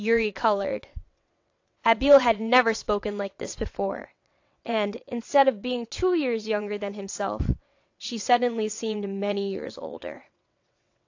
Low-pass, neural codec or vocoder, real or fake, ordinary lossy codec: 7.2 kHz; vocoder, 22.05 kHz, 80 mel bands, WaveNeXt; fake; AAC, 48 kbps